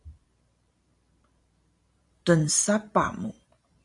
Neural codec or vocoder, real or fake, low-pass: vocoder, 44.1 kHz, 128 mel bands every 512 samples, BigVGAN v2; fake; 10.8 kHz